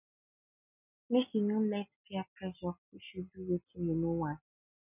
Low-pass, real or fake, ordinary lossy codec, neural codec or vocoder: 3.6 kHz; real; none; none